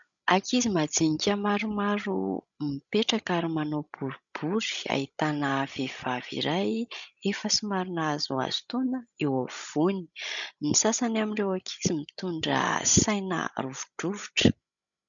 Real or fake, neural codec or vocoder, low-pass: fake; codec, 16 kHz, 16 kbps, FreqCodec, larger model; 7.2 kHz